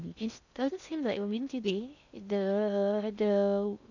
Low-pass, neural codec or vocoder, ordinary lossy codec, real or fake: 7.2 kHz; codec, 16 kHz in and 24 kHz out, 0.6 kbps, FocalCodec, streaming, 2048 codes; none; fake